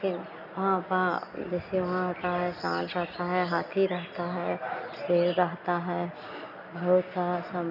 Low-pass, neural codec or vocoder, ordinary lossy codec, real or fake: 5.4 kHz; none; none; real